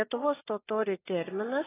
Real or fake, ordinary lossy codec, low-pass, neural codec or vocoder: real; AAC, 16 kbps; 3.6 kHz; none